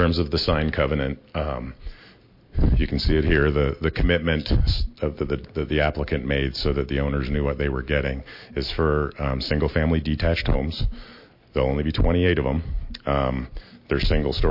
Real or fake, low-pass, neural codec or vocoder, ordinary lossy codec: real; 5.4 kHz; none; MP3, 32 kbps